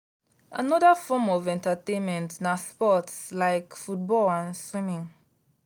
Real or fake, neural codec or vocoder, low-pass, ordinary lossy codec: real; none; none; none